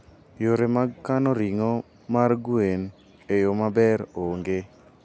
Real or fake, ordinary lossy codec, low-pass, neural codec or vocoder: real; none; none; none